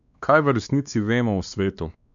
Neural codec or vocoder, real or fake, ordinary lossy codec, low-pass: codec, 16 kHz, 2 kbps, X-Codec, WavLM features, trained on Multilingual LibriSpeech; fake; none; 7.2 kHz